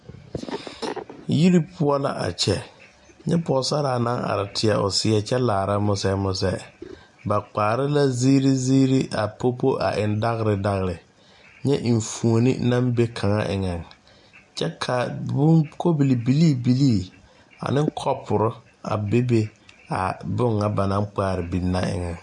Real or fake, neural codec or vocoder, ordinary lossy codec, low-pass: real; none; MP3, 64 kbps; 10.8 kHz